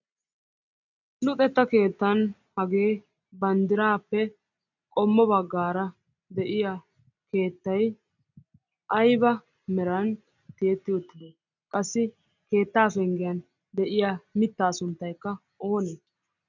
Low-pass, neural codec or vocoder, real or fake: 7.2 kHz; none; real